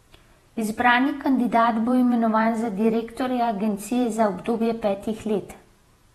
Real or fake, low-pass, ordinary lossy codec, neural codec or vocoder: fake; 19.8 kHz; AAC, 32 kbps; vocoder, 48 kHz, 128 mel bands, Vocos